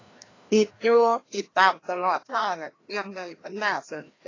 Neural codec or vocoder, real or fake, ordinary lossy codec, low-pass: codec, 16 kHz, 1 kbps, FreqCodec, larger model; fake; AAC, 32 kbps; 7.2 kHz